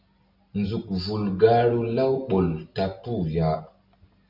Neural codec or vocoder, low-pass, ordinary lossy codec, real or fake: none; 5.4 kHz; Opus, 64 kbps; real